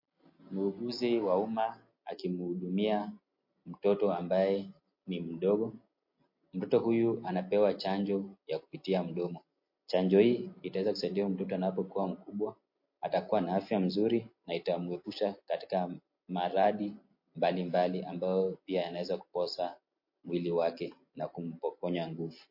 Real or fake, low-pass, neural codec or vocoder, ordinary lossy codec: real; 5.4 kHz; none; MP3, 32 kbps